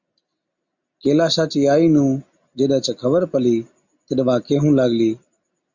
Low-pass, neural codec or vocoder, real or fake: 7.2 kHz; none; real